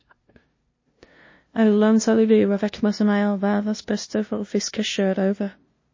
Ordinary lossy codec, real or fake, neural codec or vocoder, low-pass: MP3, 32 kbps; fake; codec, 16 kHz, 0.5 kbps, FunCodec, trained on LibriTTS, 25 frames a second; 7.2 kHz